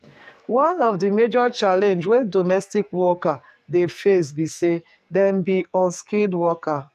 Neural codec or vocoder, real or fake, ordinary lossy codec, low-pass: codec, 44.1 kHz, 2.6 kbps, SNAC; fake; none; 14.4 kHz